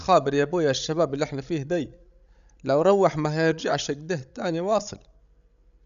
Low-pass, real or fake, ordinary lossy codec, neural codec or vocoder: 7.2 kHz; fake; none; codec, 16 kHz, 16 kbps, FreqCodec, larger model